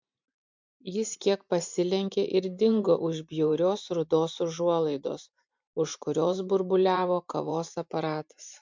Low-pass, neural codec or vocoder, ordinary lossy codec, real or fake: 7.2 kHz; vocoder, 44.1 kHz, 128 mel bands every 512 samples, BigVGAN v2; MP3, 64 kbps; fake